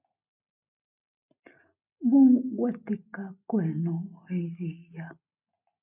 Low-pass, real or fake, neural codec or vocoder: 3.6 kHz; real; none